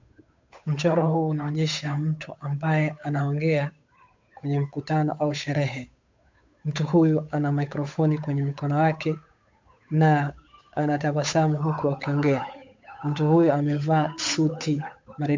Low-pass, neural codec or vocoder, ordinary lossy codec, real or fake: 7.2 kHz; codec, 16 kHz, 8 kbps, FunCodec, trained on Chinese and English, 25 frames a second; MP3, 64 kbps; fake